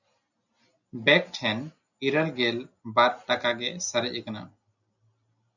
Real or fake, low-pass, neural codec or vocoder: real; 7.2 kHz; none